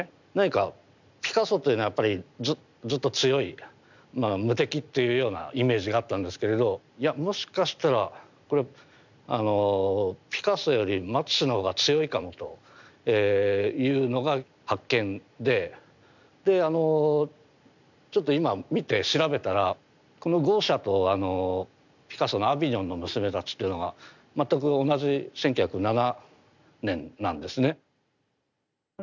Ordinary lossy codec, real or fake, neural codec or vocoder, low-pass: none; real; none; 7.2 kHz